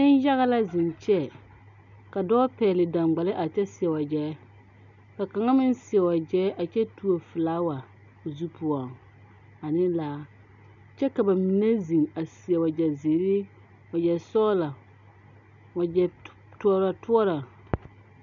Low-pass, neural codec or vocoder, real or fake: 7.2 kHz; none; real